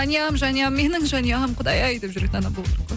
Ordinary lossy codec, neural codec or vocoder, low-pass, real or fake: none; none; none; real